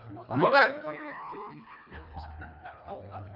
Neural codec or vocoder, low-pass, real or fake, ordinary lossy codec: codec, 24 kHz, 1.5 kbps, HILCodec; 5.4 kHz; fake; none